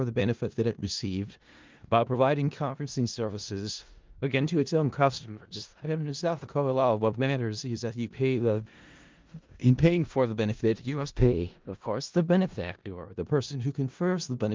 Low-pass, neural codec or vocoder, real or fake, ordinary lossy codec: 7.2 kHz; codec, 16 kHz in and 24 kHz out, 0.4 kbps, LongCat-Audio-Codec, four codebook decoder; fake; Opus, 32 kbps